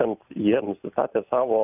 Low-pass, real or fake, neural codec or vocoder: 3.6 kHz; real; none